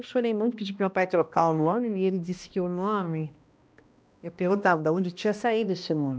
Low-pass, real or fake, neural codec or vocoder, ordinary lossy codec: none; fake; codec, 16 kHz, 1 kbps, X-Codec, HuBERT features, trained on balanced general audio; none